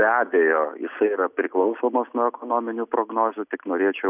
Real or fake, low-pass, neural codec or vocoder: real; 3.6 kHz; none